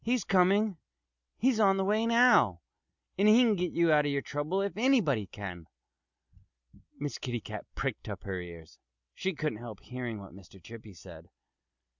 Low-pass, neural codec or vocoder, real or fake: 7.2 kHz; none; real